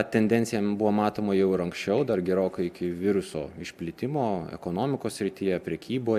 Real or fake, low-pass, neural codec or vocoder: real; 14.4 kHz; none